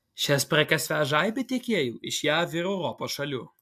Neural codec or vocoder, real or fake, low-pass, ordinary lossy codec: none; real; 14.4 kHz; AAC, 96 kbps